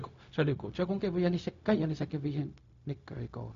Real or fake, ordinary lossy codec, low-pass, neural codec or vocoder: fake; MP3, 48 kbps; 7.2 kHz; codec, 16 kHz, 0.4 kbps, LongCat-Audio-Codec